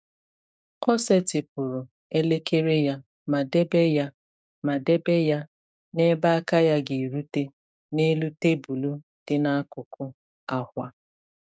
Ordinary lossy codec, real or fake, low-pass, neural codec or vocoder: none; fake; none; codec, 16 kHz, 6 kbps, DAC